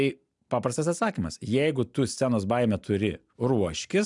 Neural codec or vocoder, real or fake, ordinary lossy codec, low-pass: none; real; MP3, 96 kbps; 10.8 kHz